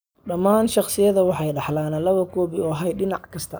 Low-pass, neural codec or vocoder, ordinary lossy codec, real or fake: none; none; none; real